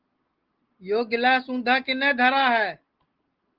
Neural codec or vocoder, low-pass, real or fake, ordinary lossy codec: none; 5.4 kHz; real; Opus, 16 kbps